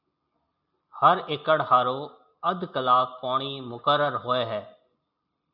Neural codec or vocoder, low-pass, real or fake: none; 5.4 kHz; real